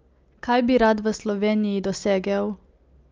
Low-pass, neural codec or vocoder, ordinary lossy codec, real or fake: 7.2 kHz; none; Opus, 24 kbps; real